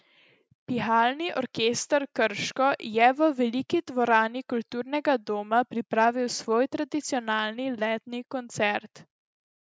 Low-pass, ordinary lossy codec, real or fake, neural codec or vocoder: none; none; real; none